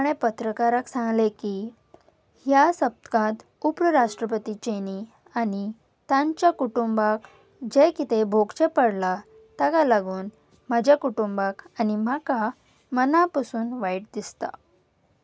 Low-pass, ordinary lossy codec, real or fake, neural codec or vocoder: none; none; real; none